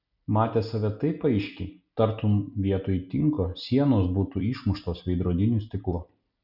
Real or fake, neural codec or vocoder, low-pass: real; none; 5.4 kHz